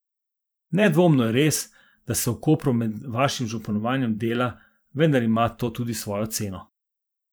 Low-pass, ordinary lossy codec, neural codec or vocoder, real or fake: none; none; vocoder, 44.1 kHz, 128 mel bands every 512 samples, BigVGAN v2; fake